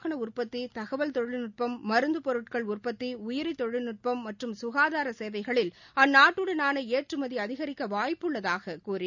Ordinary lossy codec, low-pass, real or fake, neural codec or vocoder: none; 7.2 kHz; real; none